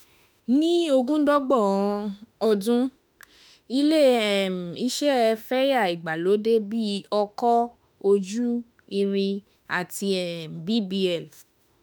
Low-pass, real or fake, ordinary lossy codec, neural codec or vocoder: none; fake; none; autoencoder, 48 kHz, 32 numbers a frame, DAC-VAE, trained on Japanese speech